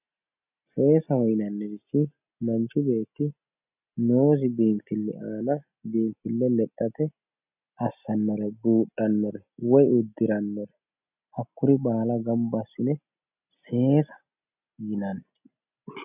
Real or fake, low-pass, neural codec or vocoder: real; 3.6 kHz; none